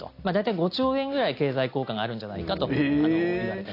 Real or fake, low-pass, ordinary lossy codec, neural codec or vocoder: real; 5.4 kHz; AAC, 32 kbps; none